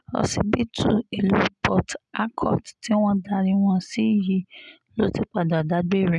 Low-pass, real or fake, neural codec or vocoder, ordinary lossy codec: 10.8 kHz; real; none; none